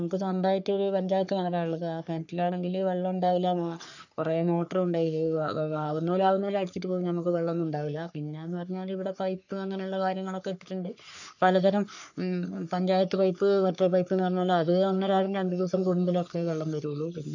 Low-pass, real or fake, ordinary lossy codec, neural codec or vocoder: 7.2 kHz; fake; none; codec, 44.1 kHz, 3.4 kbps, Pupu-Codec